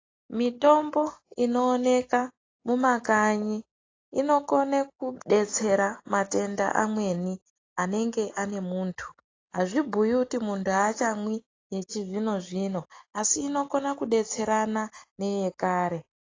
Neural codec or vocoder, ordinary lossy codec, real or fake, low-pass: none; AAC, 32 kbps; real; 7.2 kHz